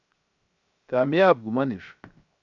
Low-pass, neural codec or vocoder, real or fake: 7.2 kHz; codec, 16 kHz, 0.7 kbps, FocalCodec; fake